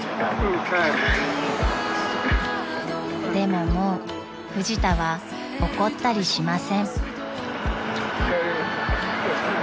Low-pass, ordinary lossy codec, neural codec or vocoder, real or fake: none; none; none; real